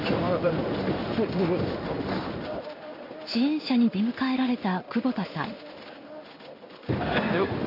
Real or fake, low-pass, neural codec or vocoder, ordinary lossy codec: fake; 5.4 kHz; codec, 16 kHz in and 24 kHz out, 1 kbps, XY-Tokenizer; none